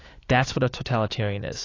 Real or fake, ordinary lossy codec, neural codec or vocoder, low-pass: fake; AAC, 32 kbps; autoencoder, 48 kHz, 128 numbers a frame, DAC-VAE, trained on Japanese speech; 7.2 kHz